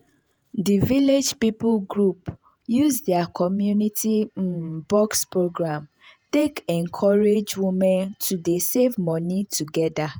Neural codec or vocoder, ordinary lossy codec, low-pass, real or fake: vocoder, 48 kHz, 128 mel bands, Vocos; none; none; fake